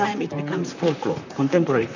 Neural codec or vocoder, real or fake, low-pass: vocoder, 44.1 kHz, 128 mel bands, Pupu-Vocoder; fake; 7.2 kHz